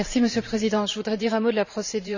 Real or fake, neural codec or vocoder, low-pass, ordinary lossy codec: fake; vocoder, 44.1 kHz, 128 mel bands every 512 samples, BigVGAN v2; 7.2 kHz; none